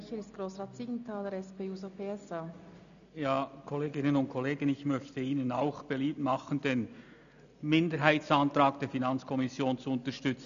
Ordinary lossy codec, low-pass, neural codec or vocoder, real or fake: none; 7.2 kHz; none; real